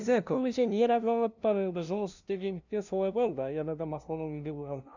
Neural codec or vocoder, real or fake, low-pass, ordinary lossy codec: codec, 16 kHz, 0.5 kbps, FunCodec, trained on LibriTTS, 25 frames a second; fake; 7.2 kHz; none